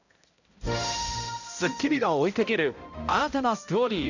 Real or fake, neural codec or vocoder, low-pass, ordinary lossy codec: fake; codec, 16 kHz, 0.5 kbps, X-Codec, HuBERT features, trained on balanced general audio; 7.2 kHz; none